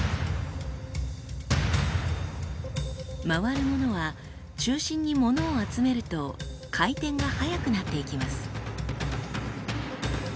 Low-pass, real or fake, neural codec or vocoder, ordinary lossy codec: none; real; none; none